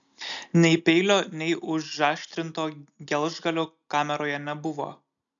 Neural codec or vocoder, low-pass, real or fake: none; 7.2 kHz; real